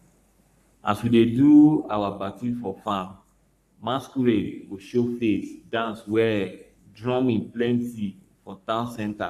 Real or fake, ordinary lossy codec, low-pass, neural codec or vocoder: fake; none; 14.4 kHz; codec, 44.1 kHz, 3.4 kbps, Pupu-Codec